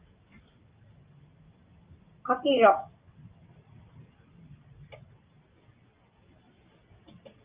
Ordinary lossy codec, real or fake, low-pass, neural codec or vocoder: Opus, 24 kbps; real; 3.6 kHz; none